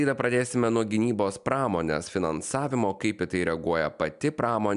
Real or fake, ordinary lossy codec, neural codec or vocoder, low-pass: real; MP3, 96 kbps; none; 10.8 kHz